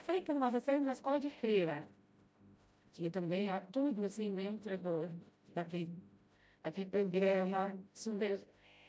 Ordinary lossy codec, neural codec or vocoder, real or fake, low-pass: none; codec, 16 kHz, 0.5 kbps, FreqCodec, smaller model; fake; none